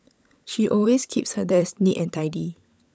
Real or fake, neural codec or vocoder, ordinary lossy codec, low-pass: fake; codec, 16 kHz, 16 kbps, FunCodec, trained on LibriTTS, 50 frames a second; none; none